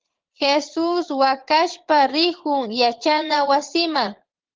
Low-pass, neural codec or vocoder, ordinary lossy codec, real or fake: 7.2 kHz; vocoder, 22.05 kHz, 80 mel bands, Vocos; Opus, 16 kbps; fake